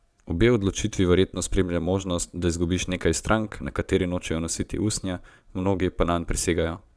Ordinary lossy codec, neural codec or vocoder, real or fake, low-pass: none; vocoder, 22.05 kHz, 80 mel bands, Vocos; fake; none